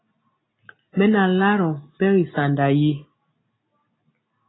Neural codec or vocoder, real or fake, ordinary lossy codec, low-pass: none; real; AAC, 16 kbps; 7.2 kHz